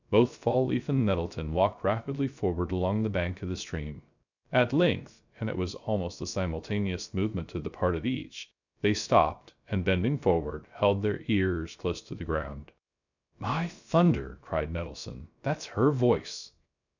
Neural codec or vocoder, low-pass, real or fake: codec, 16 kHz, 0.3 kbps, FocalCodec; 7.2 kHz; fake